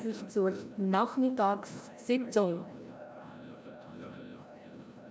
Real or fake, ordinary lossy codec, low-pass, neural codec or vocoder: fake; none; none; codec, 16 kHz, 0.5 kbps, FreqCodec, larger model